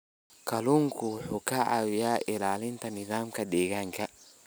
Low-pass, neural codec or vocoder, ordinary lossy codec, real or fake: none; none; none; real